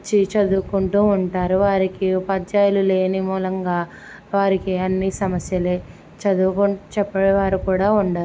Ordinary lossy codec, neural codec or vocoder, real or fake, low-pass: none; none; real; none